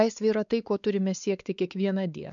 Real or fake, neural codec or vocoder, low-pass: fake; codec, 16 kHz, 4 kbps, X-Codec, WavLM features, trained on Multilingual LibriSpeech; 7.2 kHz